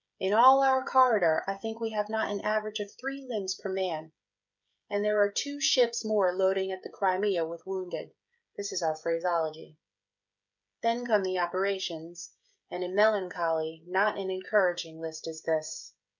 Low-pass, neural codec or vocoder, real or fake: 7.2 kHz; codec, 16 kHz, 16 kbps, FreqCodec, smaller model; fake